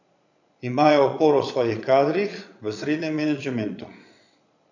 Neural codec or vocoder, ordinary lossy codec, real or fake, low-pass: vocoder, 22.05 kHz, 80 mel bands, Vocos; none; fake; 7.2 kHz